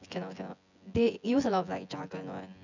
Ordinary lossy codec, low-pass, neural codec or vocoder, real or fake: none; 7.2 kHz; vocoder, 24 kHz, 100 mel bands, Vocos; fake